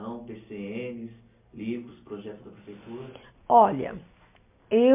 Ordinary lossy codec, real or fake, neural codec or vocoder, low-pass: MP3, 24 kbps; real; none; 3.6 kHz